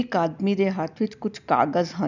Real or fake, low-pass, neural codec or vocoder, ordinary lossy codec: real; 7.2 kHz; none; none